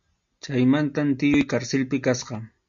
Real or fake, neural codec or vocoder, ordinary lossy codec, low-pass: real; none; MP3, 48 kbps; 7.2 kHz